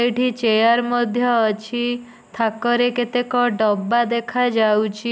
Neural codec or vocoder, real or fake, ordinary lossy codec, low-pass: none; real; none; none